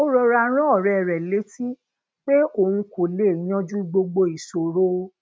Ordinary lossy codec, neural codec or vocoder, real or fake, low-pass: none; none; real; none